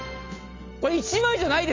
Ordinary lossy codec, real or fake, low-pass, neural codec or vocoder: AAC, 32 kbps; real; 7.2 kHz; none